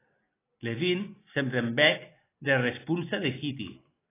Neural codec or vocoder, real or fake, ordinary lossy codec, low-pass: none; real; AAC, 16 kbps; 3.6 kHz